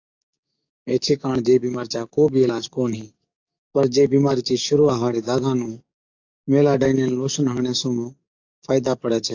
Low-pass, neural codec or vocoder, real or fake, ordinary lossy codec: 7.2 kHz; codec, 44.1 kHz, 7.8 kbps, DAC; fake; AAC, 48 kbps